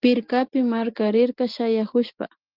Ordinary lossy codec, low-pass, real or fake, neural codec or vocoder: Opus, 24 kbps; 5.4 kHz; real; none